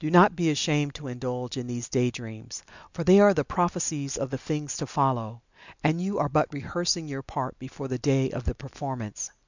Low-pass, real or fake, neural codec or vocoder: 7.2 kHz; real; none